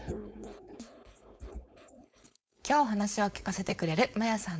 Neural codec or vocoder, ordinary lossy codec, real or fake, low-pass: codec, 16 kHz, 4.8 kbps, FACodec; none; fake; none